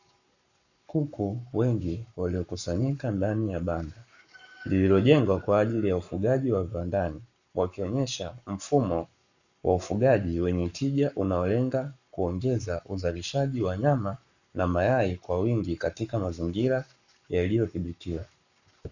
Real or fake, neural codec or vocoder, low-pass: fake; codec, 44.1 kHz, 7.8 kbps, Pupu-Codec; 7.2 kHz